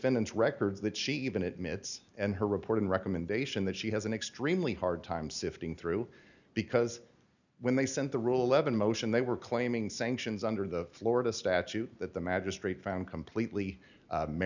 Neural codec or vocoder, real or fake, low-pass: none; real; 7.2 kHz